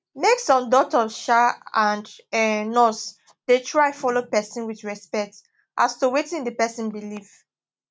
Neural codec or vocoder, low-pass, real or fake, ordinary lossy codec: none; none; real; none